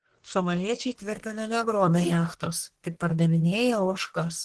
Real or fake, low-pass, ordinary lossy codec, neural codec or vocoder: fake; 10.8 kHz; Opus, 16 kbps; codec, 44.1 kHz, 1.7 kbps, Pupu-Codec